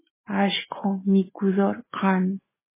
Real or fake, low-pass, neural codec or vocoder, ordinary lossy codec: real; 3.6 kHz; none; MP3, 16 kbps